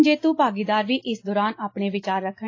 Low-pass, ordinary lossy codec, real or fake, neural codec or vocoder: 7.2 kHz; AAC, 32 kbps; real; none